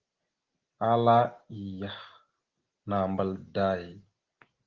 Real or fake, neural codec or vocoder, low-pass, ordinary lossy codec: real; none; 7.2 kHz; Opus, 16 kbps